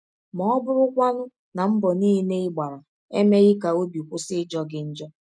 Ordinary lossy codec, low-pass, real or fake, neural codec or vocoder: none; 9.9 kHz; real; none